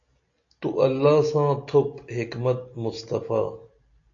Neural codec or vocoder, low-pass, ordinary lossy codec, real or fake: none; 7.2 kHz; AAC, 48 kbps; real